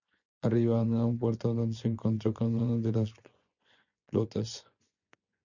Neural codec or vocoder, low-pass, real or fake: vocoder, 24 kHz, 100 mel bands, Vocos; 7.2 kHz; fake